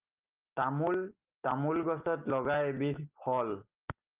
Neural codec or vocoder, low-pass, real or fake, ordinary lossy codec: none; 3.6 kHz; real; Opus, 32 kbps